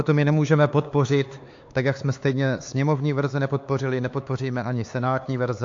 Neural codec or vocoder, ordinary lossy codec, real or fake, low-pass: codec, 16 kHz, 4 kbps, X-Codec, HuBERT features, trained on LibriSpeech; AAC, 48 kbps; fake; 7.2 kHz